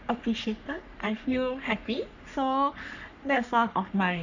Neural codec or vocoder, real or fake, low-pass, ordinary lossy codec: codec, 44.1 kHz, 3.4 kbps, Pupu-Codec; fake; 7.2 kHz; none